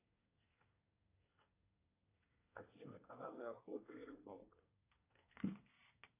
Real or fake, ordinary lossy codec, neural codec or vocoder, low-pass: fake; AAC, 24 kbps; codec, 24 kHz, 1 kbps, SNAC; 3.6 kHz